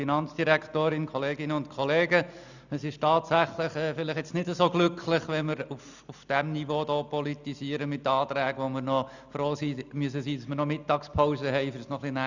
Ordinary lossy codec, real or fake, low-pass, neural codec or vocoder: none; real; 7.2 kHz; none